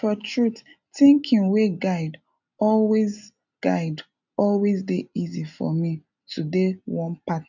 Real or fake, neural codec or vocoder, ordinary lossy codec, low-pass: real; none; none; 7.2 kHz